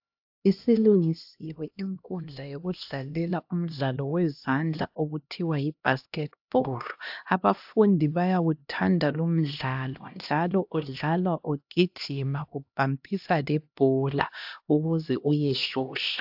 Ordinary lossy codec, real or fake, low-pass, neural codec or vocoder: AAC, 48 kbps; fake; 5.4 kHz; codec, 16 kHz, 1 kbps, X-Codec, HuBERT features, trained on LibriSpeech